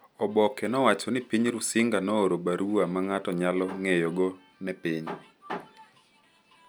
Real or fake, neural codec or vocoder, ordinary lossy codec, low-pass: real; none; none; none